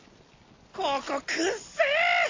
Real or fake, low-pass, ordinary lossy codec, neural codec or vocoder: real; 7.2 kHz; AAC, 32 kbps; none